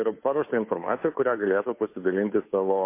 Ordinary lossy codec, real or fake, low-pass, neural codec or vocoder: MP3, 24 kbps; fake; 3.6 kHz; codec, 16 kHz, 2 kbps, FunCodec, trained on Chinese and English, 25 frames a second